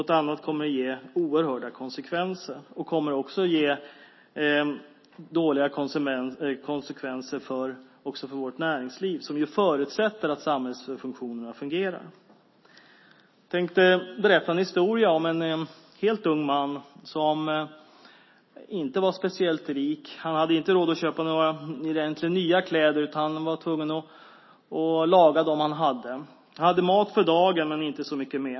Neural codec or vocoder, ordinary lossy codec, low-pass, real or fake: none; MP3, 24 kbps; 7.2 kHz; real